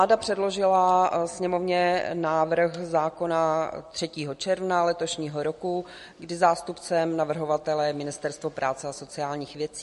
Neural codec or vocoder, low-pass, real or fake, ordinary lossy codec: none; 14.4 kHz; real; MP3, 48 kbps